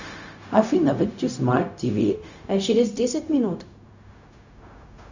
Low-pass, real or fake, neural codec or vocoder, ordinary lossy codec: 7.2 kHz; fake; codec, 16 kHz, 0.4 kbps, LongCat-Audio-Codec; Opus, 64 kbps